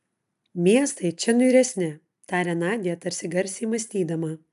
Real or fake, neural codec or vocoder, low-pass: real; none; 14.4 kHz